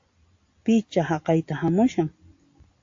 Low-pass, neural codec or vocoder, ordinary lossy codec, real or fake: 7.2 kHz; none; AAC, 48 kbps; real